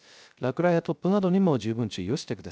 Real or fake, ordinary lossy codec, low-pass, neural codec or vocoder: fake; none; none; codec, 16 kHz, 0.3 kbps, FocalCodec